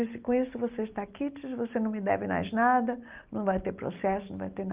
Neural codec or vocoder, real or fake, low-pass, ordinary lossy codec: none; real; 3.6 kHz; Opus, 32 kbps